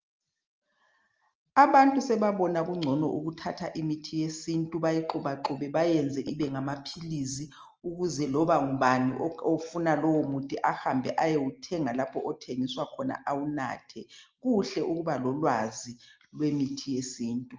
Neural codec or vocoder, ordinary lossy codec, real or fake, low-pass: none; Opus, 24 kbps; real; 7.2 kHz